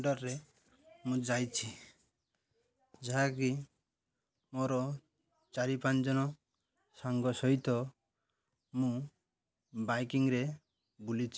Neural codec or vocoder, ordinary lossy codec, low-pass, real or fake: none; none; none; real